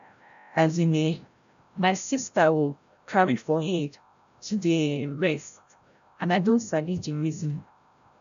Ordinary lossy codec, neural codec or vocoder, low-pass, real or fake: none; codec, 16 kHz, 0.5 kbps, FreqCodec, larger model; 7.2 kHz; fake